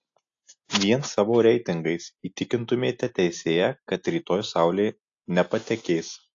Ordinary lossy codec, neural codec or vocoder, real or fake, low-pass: AAC, 48 kbps; none; real; 7.2 kHz